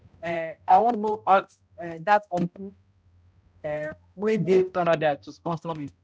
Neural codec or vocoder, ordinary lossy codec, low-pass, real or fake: codec, 16 kHz, 0.5 kbps, X-Codec, HuBERT features, trained on balanced general audio; none; none; fake